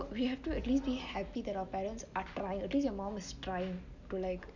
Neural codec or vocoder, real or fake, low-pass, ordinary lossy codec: none; real; 7.2 kHz; none